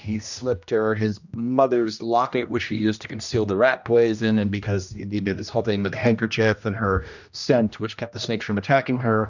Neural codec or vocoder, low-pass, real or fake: codec, 16 kHz, 1 kbps, X-Codec, HuBERT features, trained on general audio; 7.2 kHz; fake